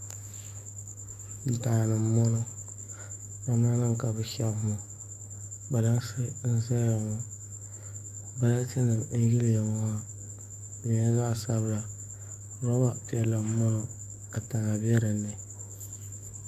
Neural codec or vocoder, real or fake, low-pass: codec, 44.1 kHz, 7.8 kbps, DAC; fake; 14.4 kHz